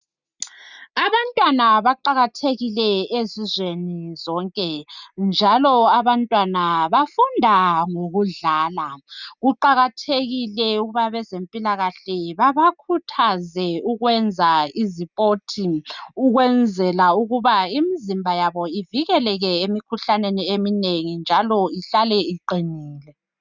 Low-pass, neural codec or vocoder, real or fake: 7.2 kHz; none; real